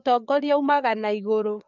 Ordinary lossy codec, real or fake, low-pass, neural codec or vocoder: none; fake; 7.2 kHz; codec, 16 kHz, 4 kbps, FreqCodec, larger model